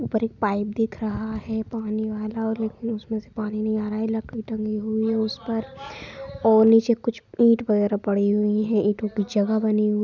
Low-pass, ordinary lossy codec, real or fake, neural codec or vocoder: 7.2 kHz; none; real; none